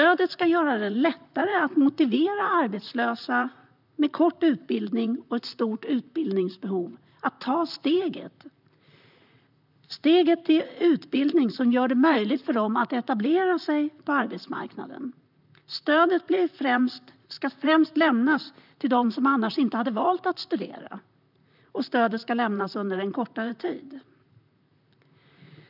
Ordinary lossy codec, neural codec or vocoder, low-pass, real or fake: none; vocoder, 44.1 kHz, 128 mel bands, Pupu-Vocoder; 5.4 kHz; fake